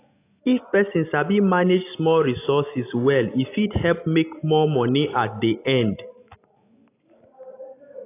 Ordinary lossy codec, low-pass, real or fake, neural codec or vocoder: AAC, 24 kbps; 3.6 kHz; real; none